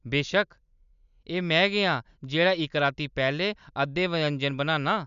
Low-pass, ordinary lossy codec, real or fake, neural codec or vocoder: 7.2 kHz; none; real; none